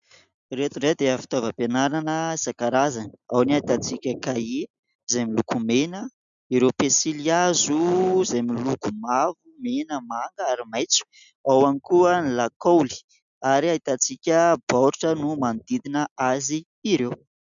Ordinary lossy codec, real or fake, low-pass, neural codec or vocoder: MP3, 96 kbps; real; 7.2 kHz; none